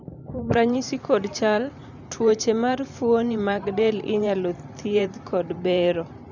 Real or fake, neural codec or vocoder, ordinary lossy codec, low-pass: fake; vocoder, 44.1 kHz, 128 mel bands every 512 samples, BigVGAN v2; none; 7.2 kHz